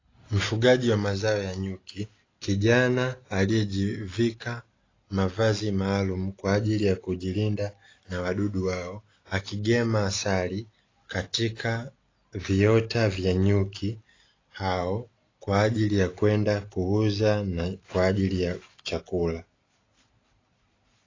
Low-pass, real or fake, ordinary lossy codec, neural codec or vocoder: 7.2 kHz; real; AAC, 32 kbps; none